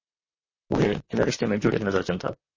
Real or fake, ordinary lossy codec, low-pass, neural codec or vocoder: fake; MP3, 32 kbps; 7.2 kHz; vocoder, 44.1 kHz, 128 mel bands, Pupu-Vocoder